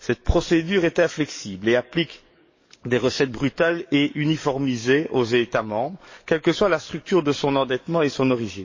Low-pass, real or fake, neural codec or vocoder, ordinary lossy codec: 7.2 kHz; fake; codec, 44.1 kHz, 7.8 kbps, DAC; MP3, 32 kbps